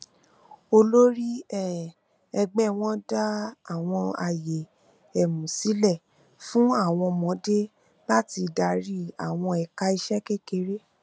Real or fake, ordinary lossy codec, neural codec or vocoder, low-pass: real; none; none; none